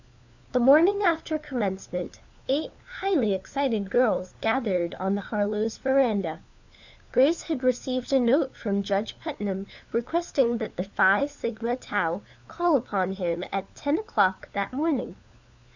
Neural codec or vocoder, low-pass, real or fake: codec, 16 kHz, 4 kbps, FunCodec, trained on LibriTTS, 50 frames a second; 7.2 kHz; fake